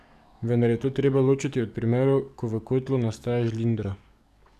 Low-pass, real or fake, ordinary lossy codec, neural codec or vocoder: 14.4 kHz; fake; none; codec, 44.1 kHz, 7.8 kbps, DAC